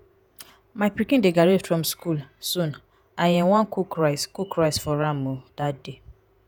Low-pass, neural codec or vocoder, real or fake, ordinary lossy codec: none; vocoder, 48 kHz, 128 mel bands, Vocos; fake; none